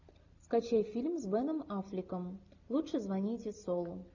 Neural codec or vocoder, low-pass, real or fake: none; 7.2 kHz; real